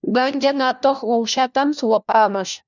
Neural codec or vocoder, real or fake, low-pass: codec, 16 kHz, 1 kbps, FunCodec, trained on LibriTTS, 50 frames a second; fake; 7.2 kHz